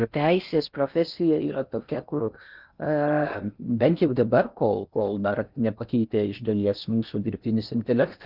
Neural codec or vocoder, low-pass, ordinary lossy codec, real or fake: codec, 16 kHz in and 24 kHz out, 0.6 kbps, FocalCodec, streaming, 4096 codes; 5.4 kHz; Opus, 24 kbps; fake